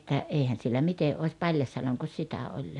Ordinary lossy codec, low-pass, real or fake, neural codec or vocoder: none; 10.8 kHz; real; none